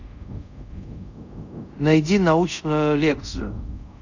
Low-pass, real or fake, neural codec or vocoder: 7.2 kHz; fake; codec, 24 kHz, 0.5 kbps, DualCodec